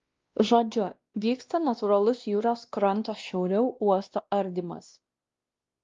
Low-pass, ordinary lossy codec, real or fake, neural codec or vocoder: 7.2 kHz; Opus, 32 kbps; fake; codec, 16 kHz, 1 kbps, X-Codec, WavLM features, trained on Multilingual LibriSpeech